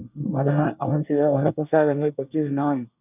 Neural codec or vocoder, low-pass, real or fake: codec, 24 kHz, 1 kbps, SNAC; 3.6 kHz; fake